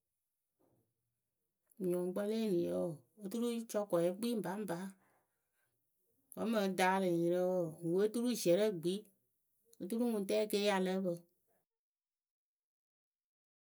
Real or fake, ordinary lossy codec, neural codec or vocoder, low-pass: real; none; none; none